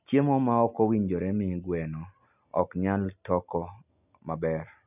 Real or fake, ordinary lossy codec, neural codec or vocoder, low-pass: real; none; none; 3.6 kHz